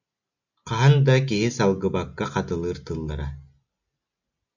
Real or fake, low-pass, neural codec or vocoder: real; 7.2 kHz; none